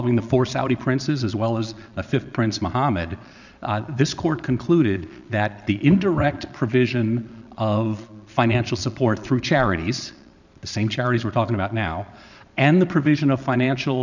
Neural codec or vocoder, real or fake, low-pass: vocoder, 22.05 kHz, 80 mel bands, Vocos; fake; 7.2 kHz